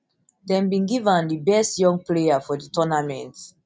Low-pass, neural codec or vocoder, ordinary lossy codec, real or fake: none; none; none; real